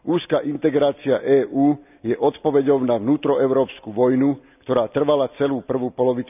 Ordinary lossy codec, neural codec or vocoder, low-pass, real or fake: none; none; 3.6 kHz; real